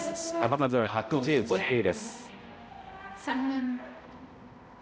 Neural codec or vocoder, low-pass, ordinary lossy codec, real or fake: codec, 16 kHz, 0.5 kbps, X-Codec, HuBERT features, trained on balanced general audio; none; none; fake